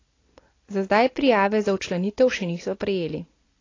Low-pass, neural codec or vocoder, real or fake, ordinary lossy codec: 7.2 kHz; none; real; AAC, 32 kbps